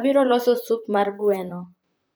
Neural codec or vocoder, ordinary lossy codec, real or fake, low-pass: vocoder, 44.1 kHz, 128 mel bands, Pupu-Vocoder; none; fake; none